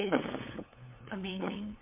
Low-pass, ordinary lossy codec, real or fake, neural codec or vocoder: 3.6 kHz; MP3, 32 kbps; fake; codec, 16 kHz, 8 kbps, FunCodec, trained on LibriTTS, 25 frames a second